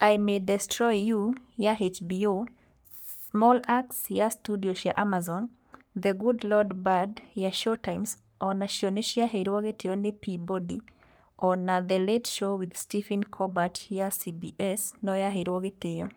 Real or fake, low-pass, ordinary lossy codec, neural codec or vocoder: fake; none; none; codec, 44.1 kHz, 3.4 kbps, Pupu-Codec